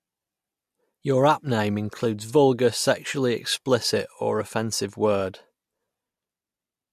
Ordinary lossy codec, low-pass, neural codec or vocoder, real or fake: MP3, 64 kbps; 14.4 kHz; none; real